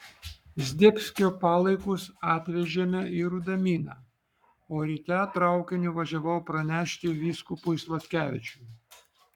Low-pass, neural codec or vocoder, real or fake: 19.8 kHz; codec, 44.1 kHz, 7.8 kbps, Pupu-Codec; fake